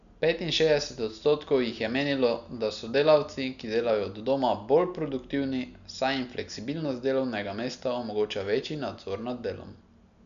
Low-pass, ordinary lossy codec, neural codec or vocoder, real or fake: 7.2 kHz; none; none; real